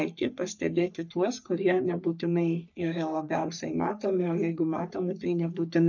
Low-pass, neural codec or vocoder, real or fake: 7.2 kHz; codec, 44.1 kHz, 3.4 kbps, Pupu-Codec; fake